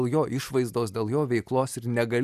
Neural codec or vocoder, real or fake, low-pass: none; real; 14.4 kHz